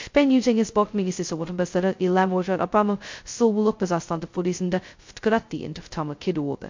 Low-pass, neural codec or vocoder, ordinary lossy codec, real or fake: 7.2 kHz; codec, 16 kHz, 0.2 kbps, FocalCodec; MP3, 48 kbps; fake